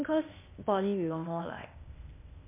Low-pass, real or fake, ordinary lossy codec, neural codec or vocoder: 3.6 kHz; fake; MP3, 24 kbps; codec, 16 kHz, 0.8 kbps, ZipCodec